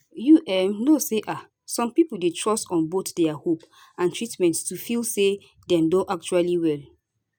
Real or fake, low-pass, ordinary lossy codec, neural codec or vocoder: real; none; none; none